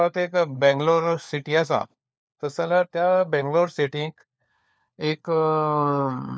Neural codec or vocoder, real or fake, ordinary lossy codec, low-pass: codec, 16 kHz, 4 kbps, FunCodec, trained on LibriTTS, 50 frames a second; fake; none; none